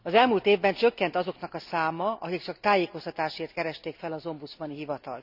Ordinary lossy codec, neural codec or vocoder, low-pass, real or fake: none; none; 5.4 kHz; real